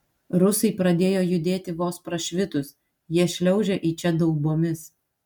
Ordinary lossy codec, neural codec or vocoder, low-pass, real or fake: MP3, 96 kbps; none; 19.8 kHz; real